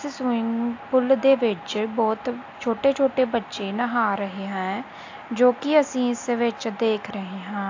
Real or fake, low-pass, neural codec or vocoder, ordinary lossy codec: real; 7.2 kHz; none; AAC, 48 kbps